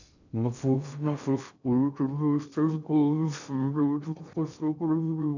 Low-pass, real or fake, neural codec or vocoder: 7.2 kHz; fake; codec, 16 kHz in and 24 kHz out, 0.6 kbps, FocalCodec, streaming, 2048 codes